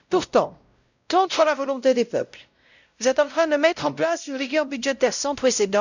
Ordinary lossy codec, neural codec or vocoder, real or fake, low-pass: none; codec, 16 kHz, 0.5 kbps, X-Codec, WavLM features, trained on Multilingual LibriSpeech; fake; 7.2 kHz